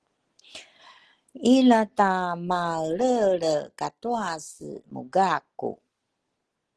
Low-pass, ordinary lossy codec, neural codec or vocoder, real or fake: 10.8 kHz; Opus, 16 kbps; none; real